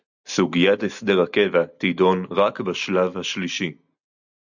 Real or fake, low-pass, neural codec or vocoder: real; 7.2 kHz; none